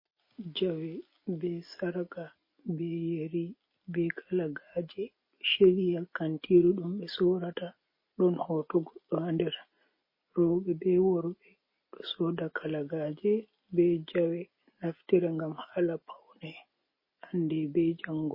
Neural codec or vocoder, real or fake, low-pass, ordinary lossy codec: none; real; 5.4 kHz; MP3, 24 kbps